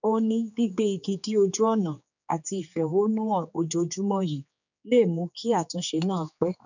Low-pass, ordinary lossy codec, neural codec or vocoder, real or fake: 7.2 kHz; none; codec, 16 kHz, 4 kbps, X-Codec, HuBERT features, trained on general audio; fake